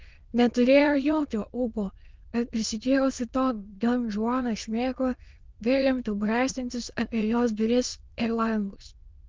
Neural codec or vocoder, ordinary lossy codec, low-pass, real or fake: autoencoder, 22.05 kHz, a latent of 192 numbers a frame, VITS, trained on many speakers; Opus, 32 kbps; 7.2 kHz; fake